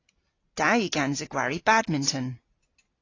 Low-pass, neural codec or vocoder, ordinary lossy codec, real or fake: 7.2 kHz; none; AAC, 32 kbps; real